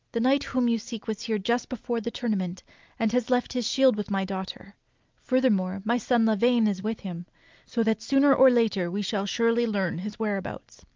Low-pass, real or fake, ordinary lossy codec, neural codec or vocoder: 7.2 kHz; real; Opus, 24 kbps; none